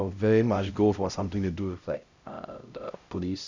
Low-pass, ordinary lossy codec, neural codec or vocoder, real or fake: 7.2 kHz; Opus, 64 kbps; codec, 16 kHz, 0.5 kbps, X-Codec, HuBERT features, trained on LibriSpeech; fake